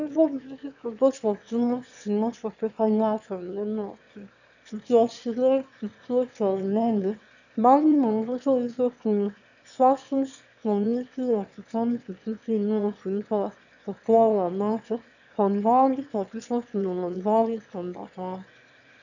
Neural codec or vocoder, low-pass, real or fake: autoencoder, 22.05 kHz, a latent of 192 numbers a frame, VITS, trained on one speaker; 7.2 kHz; fake